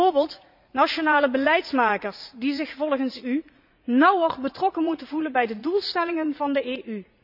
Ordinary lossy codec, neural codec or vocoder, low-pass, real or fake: none; vocoder, 44.1 kHz, 80 mel bands, Vocos; 5.4 kHz; fake